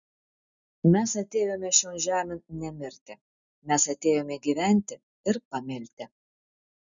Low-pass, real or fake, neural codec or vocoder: 7.2 kHz; real; none